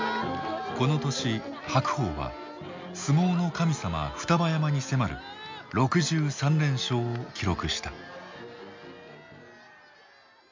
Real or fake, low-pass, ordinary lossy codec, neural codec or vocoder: real; 7.2 kHz; MP3, 64 kbps; none